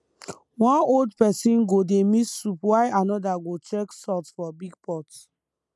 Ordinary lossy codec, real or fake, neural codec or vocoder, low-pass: none; real; none; none